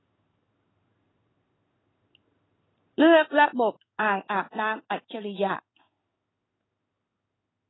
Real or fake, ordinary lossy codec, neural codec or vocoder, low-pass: fake; AAC, 16 kbps; codec, 16 kHz in and 24 kHz out, 1 kbps, XY-Tokenizer; 7.2 kHz